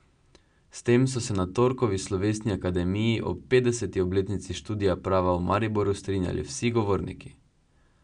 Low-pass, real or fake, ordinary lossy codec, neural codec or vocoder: 9.9 kHz; real; none; none